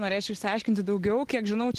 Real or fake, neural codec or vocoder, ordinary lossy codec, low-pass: real; none; Opus, 16 kbps; 14.4 kHz